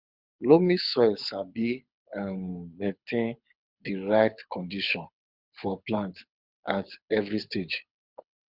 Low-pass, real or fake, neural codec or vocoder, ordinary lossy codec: 5.4 kHz; fake; codec, 24 kHz, 6 kbps, HILCodec; Opus, 64 kbps